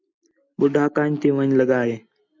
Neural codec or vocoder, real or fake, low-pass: none; real; 7.2 kHz